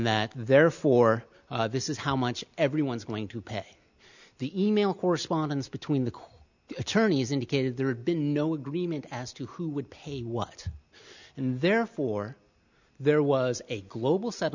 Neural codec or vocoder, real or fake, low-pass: none; real; 7.2 kHz